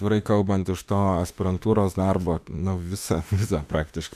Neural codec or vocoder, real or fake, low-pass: autoencoder, 48 kHz, 32 numbers a frame, DAC-VAE, trained on Japanese speech; fake; 14.4 kHz